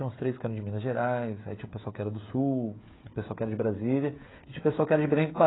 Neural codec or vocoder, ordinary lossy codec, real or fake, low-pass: codec, 16 kHz, 16 kbps, FreqCodec, smaller model; AAC, 16 kbps; fake; 7.2 kHz